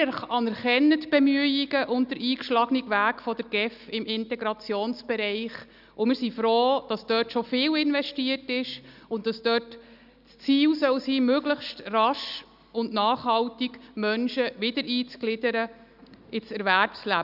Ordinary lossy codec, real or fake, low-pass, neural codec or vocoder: none; real; 5.4 kHz; none